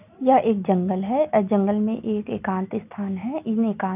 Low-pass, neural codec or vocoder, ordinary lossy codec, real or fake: 3.6 kHz; none; none; real